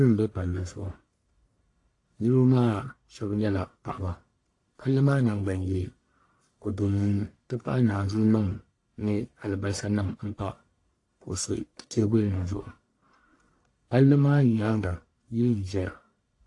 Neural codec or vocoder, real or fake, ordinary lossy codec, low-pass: codec, 44.1 kHz, 1.7 kbps, Pupu-Codec; fake; AAC, 48 kbps; 10.8 kHz